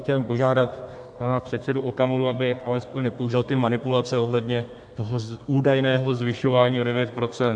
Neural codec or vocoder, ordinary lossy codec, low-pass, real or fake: codec, 32 kHz, 1.9 kbps, SNAC; AAC, 64 kbps; 9.9 kHz; fake